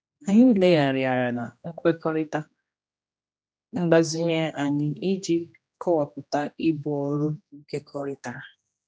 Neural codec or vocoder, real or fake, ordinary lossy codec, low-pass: codec, 16 kHz, 1 kbps, X-Codec, HuBERT features, trained on general audio; fake; none; none